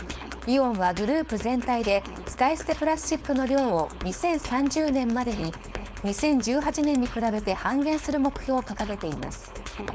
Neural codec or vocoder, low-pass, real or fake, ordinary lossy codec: codec, 16 kHz, 4.8 kbps, FACodec; none; fake; none